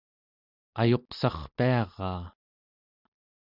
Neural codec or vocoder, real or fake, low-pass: none; real; 5.4 kHz